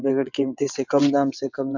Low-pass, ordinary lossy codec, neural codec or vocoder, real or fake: 7.2 kHz; none; vocoder, 22.05 kHz, 80 mel bands, WaveNeXt; fake